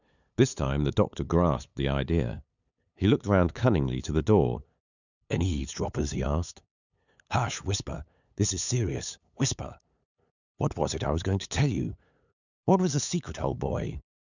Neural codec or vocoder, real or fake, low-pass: codec, 16 kHz, 8 kbps, FunCodec, trained on LibriTTS, 25 frames a second; fake; 7.2 kHz